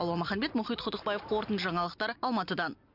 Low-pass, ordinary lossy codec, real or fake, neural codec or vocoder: 5.4 kHz; Opus, 64 kbps; real; none